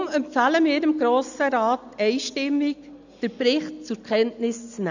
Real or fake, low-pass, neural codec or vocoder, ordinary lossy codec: real; 7.2 kHz; none; none